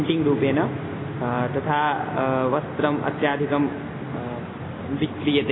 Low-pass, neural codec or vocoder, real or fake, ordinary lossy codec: 7.2 kHz; none; real; AAC, 16 kbps